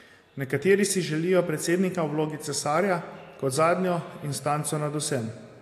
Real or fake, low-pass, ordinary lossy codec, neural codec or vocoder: real; 14.4 kHz; AAC, 64 kbps; none